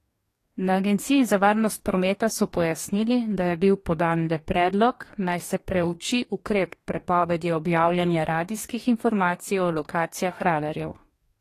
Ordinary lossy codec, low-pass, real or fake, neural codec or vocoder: AAC, 48 kbps; 14.4 kHz; fake; codec, 44.1 kHz, 2.6 kbps, DAC